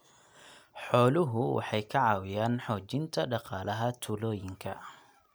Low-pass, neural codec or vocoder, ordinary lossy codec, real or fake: none; none; none; real